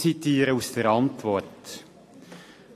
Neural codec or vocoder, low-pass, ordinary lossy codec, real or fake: none; 14.4 kHz; AAC, 48 kbps; real